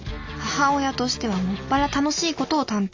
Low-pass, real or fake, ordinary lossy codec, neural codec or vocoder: 7.2 kHz; real; AAC, 48 kbps; none